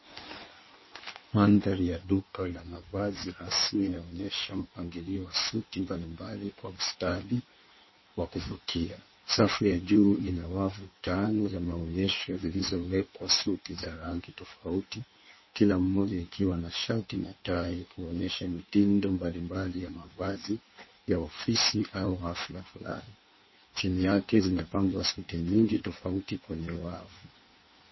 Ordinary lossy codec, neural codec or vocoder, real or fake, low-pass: MP3, 24 kbps; codec, 16 kHz in and 24 kHz out, 1.1 kbps, FireRedTTS-2 codec; fake; 7.2 kHz